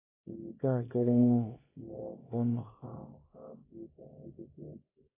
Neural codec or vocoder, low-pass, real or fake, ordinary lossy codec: codec, 24 kHz, 0.9 kbps, WavTokenizer, small release; 3.6 kHz; fake; AAC, 16 kbps